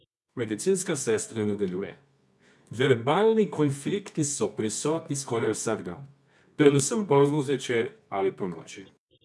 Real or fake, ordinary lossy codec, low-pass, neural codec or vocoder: fake; none; none; codec, 24 kHz, 0.9 kbps, WavTokenizer, medium music audio release